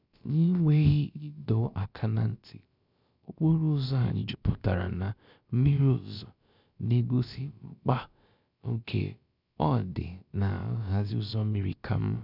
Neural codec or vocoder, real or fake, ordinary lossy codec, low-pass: codec, 16 kHz, about 1 kbps, DyCAST, with the encoder's durations; fake; none; 5.4 kHz